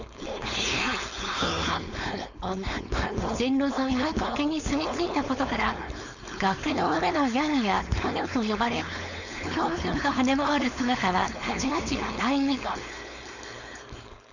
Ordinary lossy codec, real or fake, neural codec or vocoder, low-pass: none; fake; codec, 16 kHz, 4.8 kbps, FACodec; 7.2 kHz